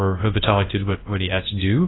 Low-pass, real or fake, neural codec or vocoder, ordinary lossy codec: 7.2 kHz; fake; codec, 24 kHz, 0.9 kbps, WavTokenizer, large speech release; AAC, 16 kbps